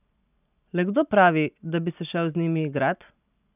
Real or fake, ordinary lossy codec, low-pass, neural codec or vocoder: real; none; 3.6 kHz; none